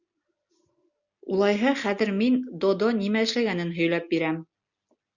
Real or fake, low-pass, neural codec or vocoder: real; 7.2 kHz; none